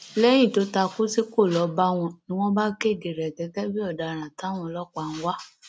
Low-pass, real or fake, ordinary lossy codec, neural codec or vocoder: none; real; none; none